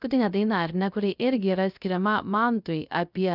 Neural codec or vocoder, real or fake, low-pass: codec, 16 kHz, 0.3 kbps, FocalCodec; fake; 5.4 kHz